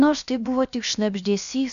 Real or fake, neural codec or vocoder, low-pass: fake; codec, 16 kHz, about 1 kbps, DyCAST, with the encoder's durations; 7.2 kHz